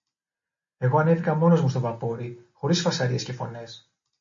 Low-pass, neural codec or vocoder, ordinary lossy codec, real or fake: 7.2 kHz; none; MP3, 32 kbps; real